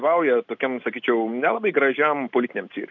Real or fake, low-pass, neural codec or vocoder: real; 7.2 kHz; none